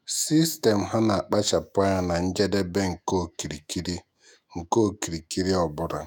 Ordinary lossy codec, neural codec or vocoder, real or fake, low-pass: none; autoencoder, 48 kHz, 128 numbers a frame, DAC-VAE, trained on Japanese speech; fake; none